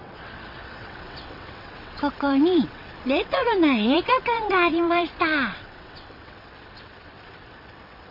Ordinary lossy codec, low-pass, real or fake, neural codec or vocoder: none; 5.4 kHz; fake; vocoder, 22.05 kHz, 80 mel bands, WaveNeXt